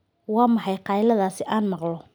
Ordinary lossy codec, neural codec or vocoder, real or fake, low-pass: none; none; real; none